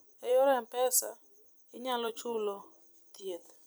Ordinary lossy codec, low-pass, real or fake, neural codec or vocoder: none; none; fake; vocoder, 44.1 kHz, 128 mel bands every 256 samples, BigVGAN v2